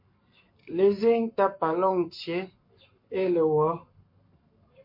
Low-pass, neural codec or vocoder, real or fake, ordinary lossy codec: 5.4 kHz; codec, 44.1 kHz, 7.8 kbps, Pupu-Codec; fake; MP3, 32 kbps